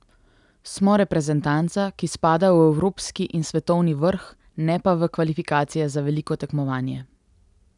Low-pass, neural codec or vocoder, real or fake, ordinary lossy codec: 10.8 kHz; none; real; none